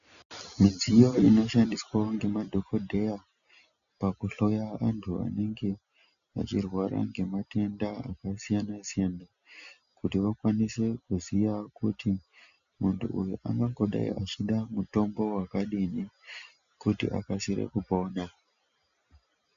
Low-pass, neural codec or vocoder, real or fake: 7.2 kHz; none; real